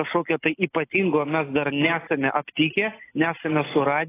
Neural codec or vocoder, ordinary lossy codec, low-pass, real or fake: none; AAC, 16 kbps; 3.6 kHz; real